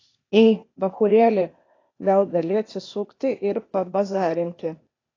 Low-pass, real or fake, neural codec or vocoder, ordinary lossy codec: 7.2 kHz; fake; codec, 16 kHz, 0.8 kbps, ZipCodec; AAC, 32 kbps